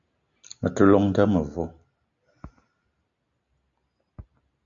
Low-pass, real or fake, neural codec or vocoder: 7.2 kHz; real; none